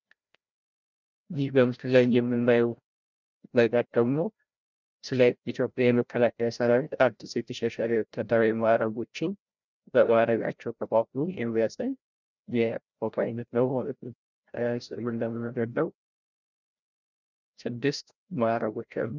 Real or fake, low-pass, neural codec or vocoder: fake; 7.2 kHz; codec, 16 kHz, 0.5 kbps, FreqCodec, larger model